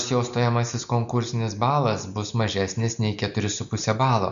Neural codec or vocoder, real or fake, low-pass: none; real; 7.2 kHz